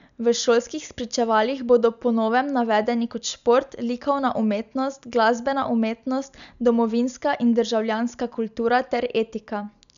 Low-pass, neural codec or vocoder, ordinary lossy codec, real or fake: 7.2 kHz; none; none; real